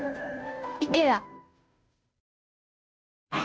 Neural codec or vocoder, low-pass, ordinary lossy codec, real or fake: codec, 16 kHz, 0.5 kbps, FunCodec, trained on Chinese and English, 25 frames a second; none; none; fake